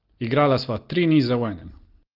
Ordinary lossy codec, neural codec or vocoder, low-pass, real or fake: Opus, 16 kbps; none; 5.4 kHz; real